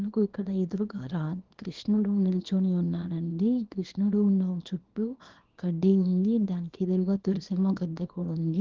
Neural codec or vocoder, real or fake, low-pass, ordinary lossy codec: codec, 24 kHz, 0.9 kbps, WavTokenizer, small release; fake; 7.2 kHz; Opus, 16 kbps